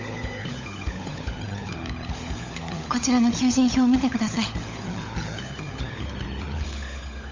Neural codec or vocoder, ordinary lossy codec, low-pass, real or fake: codec, 16 kHz, 16 kbps, FunCodec, trained on LibriTTS, 50 frames a second; MP3, 64 kbps; 7.2 kHz; fake